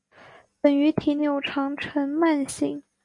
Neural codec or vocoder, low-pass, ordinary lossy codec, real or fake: none; 10.8 kHz; MP3, 48 kbps; real